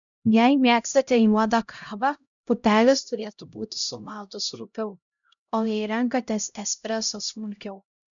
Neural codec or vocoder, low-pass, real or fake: codec, 16 kHz, 0.5 kbps, X-Codec, HuBERT features, trained on LibriSpeech; 7.2 kHz; fake